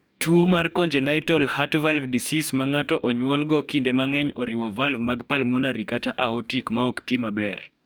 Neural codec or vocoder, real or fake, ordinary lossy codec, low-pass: codec, 44.1 kHz, 2.6 kbps, DAC; fake; none; none